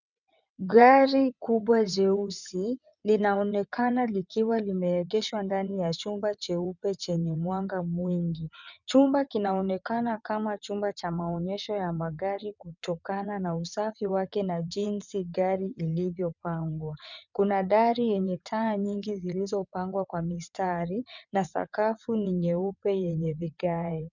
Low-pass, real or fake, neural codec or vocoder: 7.2 kHz; fake; vocoder, 22.05 kHz, 80 mel bands, WaveNeXt